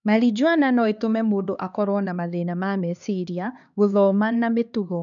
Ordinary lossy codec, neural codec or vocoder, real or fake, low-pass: none; codec, 16 kHz, 2 kbps, X-Codec, HuBERT features, trained on LibriSpeech; fake; 7.2 kHz